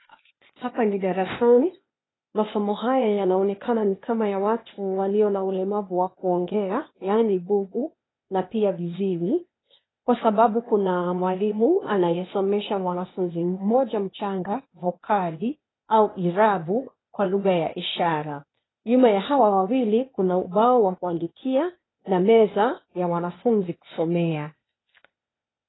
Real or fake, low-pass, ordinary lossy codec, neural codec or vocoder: fake; 7.2 kHz; AAC, 16 kbps; codec, 16 kHz, 0.8 kbps, ZipCodec